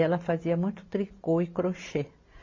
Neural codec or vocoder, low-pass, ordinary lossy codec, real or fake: none; 7.2 kHz; MP3, 32 kbps; real